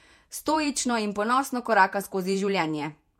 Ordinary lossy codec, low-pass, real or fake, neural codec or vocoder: MP3, 64 kbps; 19.8 kHz; fake; vocoder, 48 kHz, 128 mel bands, Vocos